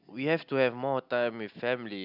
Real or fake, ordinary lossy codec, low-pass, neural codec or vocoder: real; none; 5.4 kHz; none